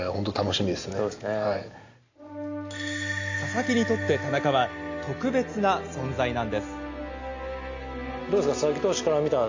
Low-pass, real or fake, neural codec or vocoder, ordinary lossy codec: 7.2 kHz; real; none; AAC, 48 kbps